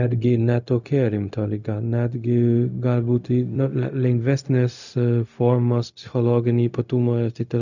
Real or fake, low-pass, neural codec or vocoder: fake; 7.2 kHz; codec, 16 kHz, 0.4 kbps, LongCat-Audio-Codec